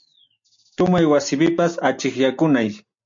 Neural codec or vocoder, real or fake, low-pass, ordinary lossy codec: none; real; 7.2 kHz; MP3, 48 kbps